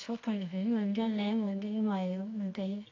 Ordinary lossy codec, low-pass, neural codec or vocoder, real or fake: AAC, 48 kbps; 7.2 kHz; codec, 24 kHz, 0.9 kbps, WavTokenizer, medium music audio release; fake